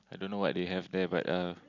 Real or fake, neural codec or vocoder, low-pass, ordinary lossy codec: real; none; 7.2 kHz; none